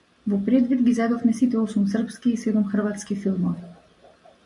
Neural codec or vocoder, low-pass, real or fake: none; 10.8 kHz; real